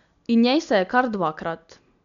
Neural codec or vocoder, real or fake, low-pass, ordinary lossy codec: none; real; 7.2 kHz; none